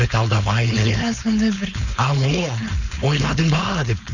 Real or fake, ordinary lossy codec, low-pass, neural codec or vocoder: fake; none; 7.2 kHz; codec, 16 kHz, 4.8 kbps, FACodec